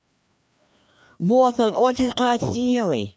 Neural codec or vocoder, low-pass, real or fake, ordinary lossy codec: codec, 16 kHz, 2 kbps, FreqCodec, larger model; none; fake; none